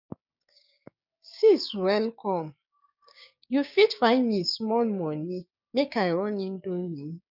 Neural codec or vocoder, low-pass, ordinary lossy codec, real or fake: codec, 16 kHz, 6 kbps, DAC; 5.4 kHz; none; fake